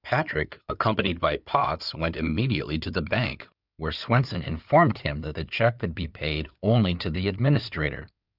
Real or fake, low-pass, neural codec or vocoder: fake; 5.4 kHz; codec, 16 kHz in and 24 kHz out, 2.2 kbps, FireRedTTS-2 codec